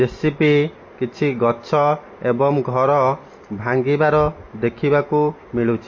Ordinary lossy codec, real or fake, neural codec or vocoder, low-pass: MP3, 32 kbps; real; none; 7.2 kHz